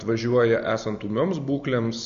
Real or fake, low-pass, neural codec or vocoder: real; 7.2 kHz; none